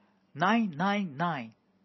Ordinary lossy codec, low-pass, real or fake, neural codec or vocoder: MP3, 24 kbps; 7.2 kHz; real; none